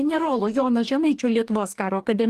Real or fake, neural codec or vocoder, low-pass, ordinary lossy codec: fake; codec, 44.1 kHz, 2.6 kbps, DAC; 14.4 kHz; Opus, 32 kbps